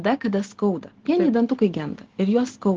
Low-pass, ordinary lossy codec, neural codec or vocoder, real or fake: 7.2 kHz; Opus, 16 kbps; none; real